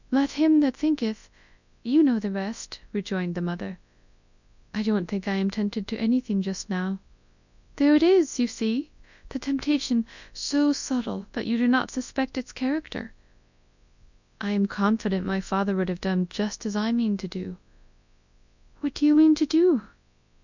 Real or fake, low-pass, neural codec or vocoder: fake; 7.2 kHz; codec, 24 kHz, 0.9 kbps, WavTokenizer, large speech release